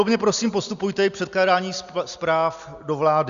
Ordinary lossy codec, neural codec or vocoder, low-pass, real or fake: Opus, 64 kbps; none; 7.2 kHz; real